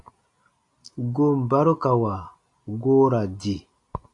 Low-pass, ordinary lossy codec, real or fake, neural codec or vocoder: 10.8 kHz; AAC, 64 kbps; real; none